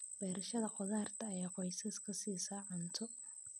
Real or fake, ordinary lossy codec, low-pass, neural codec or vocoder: real; none; none; none